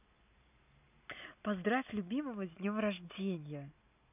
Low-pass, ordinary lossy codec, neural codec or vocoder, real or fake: 3.6 kHz; none; none; real